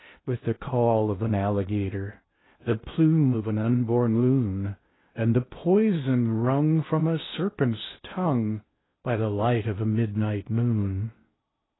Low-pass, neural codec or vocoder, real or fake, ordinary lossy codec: 7.2 kHz; codec, 16 kHz in and 24 kHz out, 0.6 kbps, FocalCodec, streaming, 2048 codes; fake; AAC, 16 kbps